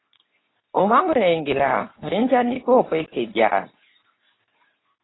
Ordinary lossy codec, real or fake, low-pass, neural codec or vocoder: AAC, 16 kbps; fake; 7.2 kHz; codec, 24 kHz, 0.9 kbps, WavTokenizer, medium speech release version 2